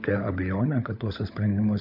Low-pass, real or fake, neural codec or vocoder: 5.4 kHz; fake; codec, 16 kHz, 16 kbps, FunCodec, trained on LibriTTS, 50 frames a second